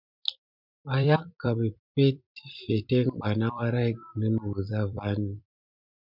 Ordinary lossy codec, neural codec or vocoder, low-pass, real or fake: MP3, 48 kbps; vocoder, 44.1 kHz, 128 mel bands every 256 samples, BigVGAN v2; 5.4 kHz; fake